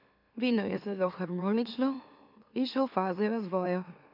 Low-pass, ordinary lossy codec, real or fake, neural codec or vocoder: 5.4 kHz; MP3, 48 kbps; fake; autoencoder, 44.1 kHz, a latent of 192 numbers a frame, MeloTTS